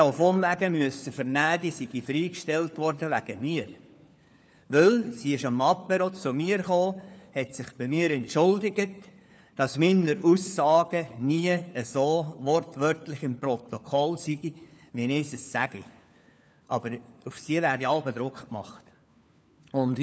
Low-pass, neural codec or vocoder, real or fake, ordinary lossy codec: none; codec, 16 kHz, 4 kbps, FunCodec, trained on LibriTTS, 50 frames a second; fake; none